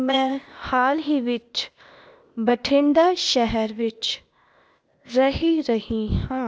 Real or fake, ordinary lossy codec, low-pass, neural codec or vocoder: fake; none; none; codec, 16 kHz, 0.8 kbps, ZipCodec